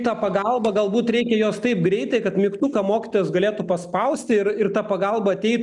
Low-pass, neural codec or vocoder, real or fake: 10.8 kHz; none; real